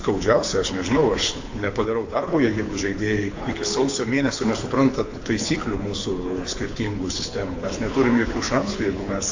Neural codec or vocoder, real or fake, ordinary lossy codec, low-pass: codec, 24 kHz, 6 kbps, HILCodec; fake; AAC, 48 kbps; 7.2 kHz